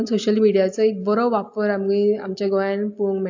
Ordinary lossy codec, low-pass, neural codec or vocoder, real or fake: AAC, 48 kbps; 7.2 kHz; none; real